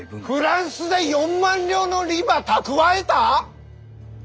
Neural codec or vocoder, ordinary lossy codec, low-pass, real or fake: none; none; none; real